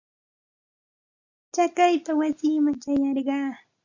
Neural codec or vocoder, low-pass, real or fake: none; 7.2 kHz; real